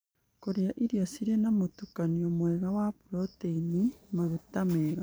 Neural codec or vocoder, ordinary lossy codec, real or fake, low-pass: vocoder, 44.1 kHz, 128 mel bands every 512 samples, BigVGAN v2; none; fake; none